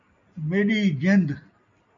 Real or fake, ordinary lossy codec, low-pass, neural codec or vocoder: real; MP3, 48 kbps; 7.2 kHz; none